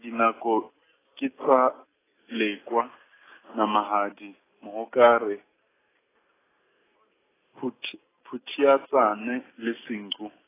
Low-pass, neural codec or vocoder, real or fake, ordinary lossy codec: 3.6 kHz; none; real; AAC, 16 kbps